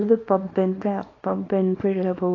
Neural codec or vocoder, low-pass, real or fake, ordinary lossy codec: codec, 24 kHz, 0.9 kbps, WavTokenizer, small release; 7.2 kHz; fake; AAC, 32 kbps